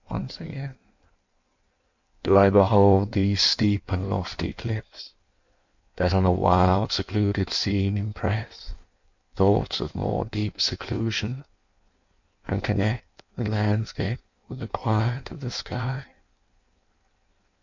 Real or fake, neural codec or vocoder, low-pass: fake; codec, 16 kHz in and 24 kHz out, 1.1 kbps, FireRedTTS-2 codec; 7.2 kHz